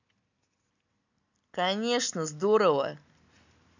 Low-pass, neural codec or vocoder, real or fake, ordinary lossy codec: 7.2 kHz; none; real; none